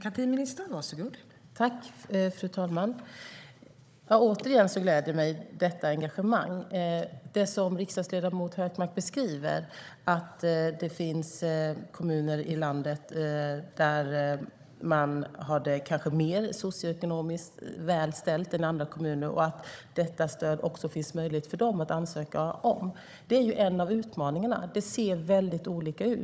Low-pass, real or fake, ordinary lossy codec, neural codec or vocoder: none; fake; none; codec, 16 kHz, 16 kbps, FunCodec, trained on Chinese and English, 50 frames a second